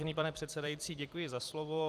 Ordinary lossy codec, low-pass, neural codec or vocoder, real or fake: Opus, 32 kbps; 14.4 kHz; vocoder, 44.1 kHz, 128 mel bands every 256 samples, BigVGAN v2; fake